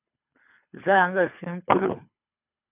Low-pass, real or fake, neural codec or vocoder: 3.6 kHz; fake; codec, 24 kHz, 3 kbps, HILCodec